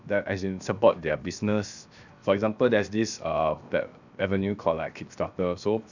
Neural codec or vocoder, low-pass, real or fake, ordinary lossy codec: codec, 16 kHz, 0.7 kbps, FocalCodec; 7.2 kHz; fake; none